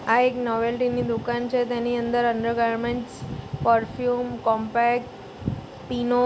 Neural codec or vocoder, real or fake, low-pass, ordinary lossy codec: none; real; none; none